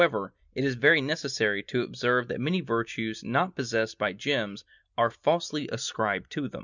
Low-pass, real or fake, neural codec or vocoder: 7.2 kHz; real; none